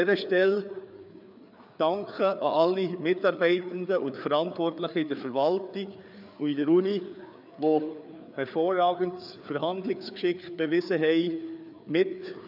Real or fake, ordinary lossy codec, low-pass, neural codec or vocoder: fake; none; 5.4 kHz; codec, 16 kHz, 4 kbps, FreqCodec, larger model